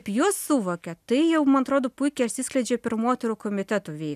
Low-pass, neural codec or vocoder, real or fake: 14.4 kHz; none; real